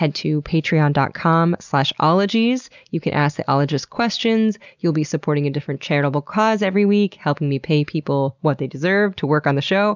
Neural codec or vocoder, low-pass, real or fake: none; 7.2 kHz; real